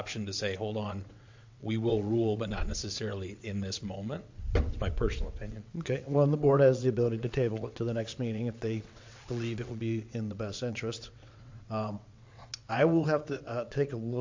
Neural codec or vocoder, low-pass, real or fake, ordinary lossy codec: vocoder, 22.05 kHz, 80 mel bands, WaveNeXt; 7.2 kHz; fake; MP3, 48 kbps